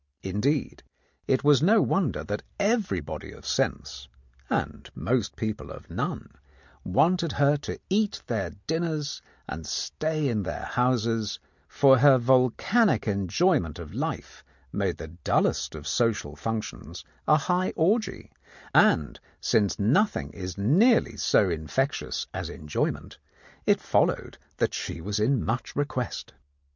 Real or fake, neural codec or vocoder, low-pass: real; none; 7.2 kHz